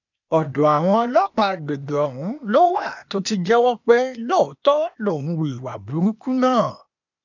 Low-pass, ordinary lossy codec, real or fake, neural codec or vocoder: 7.2 kHz; none; fake; codec, 16 kHz, 0.8 kbps, ZipCodec